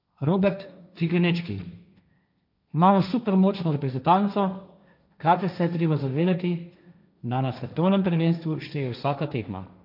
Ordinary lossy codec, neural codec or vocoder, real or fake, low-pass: none; codec, 16 kHz, 1.1 kbps, Voila-Tokenizer; fake; 5.4 kHz